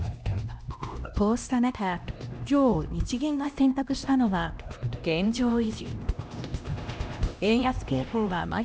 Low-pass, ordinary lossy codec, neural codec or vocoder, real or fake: none; none; codec, 16 kHz, 1 kbps, X-Codec, HuBERT features, trained on LibriSpeech; fake